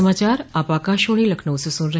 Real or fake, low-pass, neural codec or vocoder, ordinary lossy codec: real; none; none; none